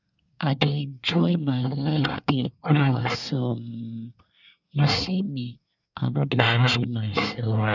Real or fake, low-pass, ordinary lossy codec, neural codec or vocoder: fake; 7.2 kHz; none; codec, 24 kHz, 1 kbps, SNAC